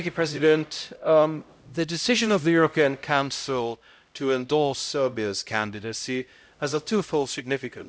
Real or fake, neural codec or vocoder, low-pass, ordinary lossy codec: fake; codec, 16 kHz, 0.5 kbps, X-Codec, HuBERT features, trained on LibriSpeech; none; none